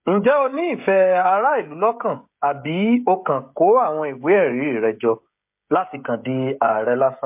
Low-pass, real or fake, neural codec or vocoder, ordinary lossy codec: 3.6 kHz; fake; codec, 16 kHz, 16 kbps, FreqCodec, smaller model; MP3, 32 kbps